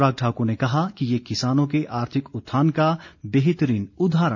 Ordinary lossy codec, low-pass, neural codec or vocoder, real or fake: none; 7.2 kHz; none; real